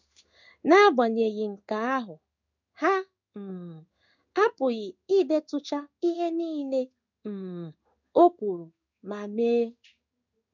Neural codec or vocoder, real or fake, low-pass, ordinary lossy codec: codec, 16 kHz in and 24 kHz out, 1 kbps, XY-Tokenizer; fake; 7.2 kHz; none